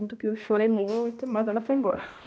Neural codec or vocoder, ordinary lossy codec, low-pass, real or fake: codec, 16 kHz, 1 kbps, X-Codec, HuBERT features, trained on balanced general audio; none; none; fake